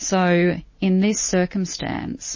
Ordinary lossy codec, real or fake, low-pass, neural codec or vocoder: MP3, 32 kbps; real; 7.2 kHz; none